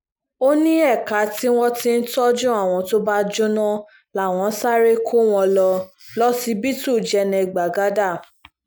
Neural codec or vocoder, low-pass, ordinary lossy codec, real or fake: none; none; none; real